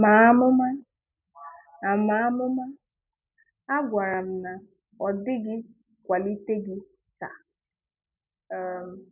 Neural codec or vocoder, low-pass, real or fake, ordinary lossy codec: none; 3.6 kHz; real; none